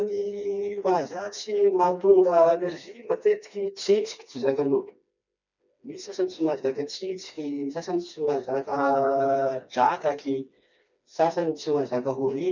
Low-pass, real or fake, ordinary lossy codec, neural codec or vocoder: 7.2 kHz; fake; none; codec, 16 kHz, 2 kbps, FreqCodec, smaller model